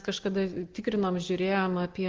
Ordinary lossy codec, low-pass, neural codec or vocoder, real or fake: Opus, 16 kbps; 7.2 kHz; none; real